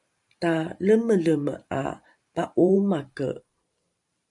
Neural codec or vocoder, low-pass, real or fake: vocoder, 44.1 kHz, 128 mel bands every 512 samples, BigVGAN v2; 10.8 kHz; fake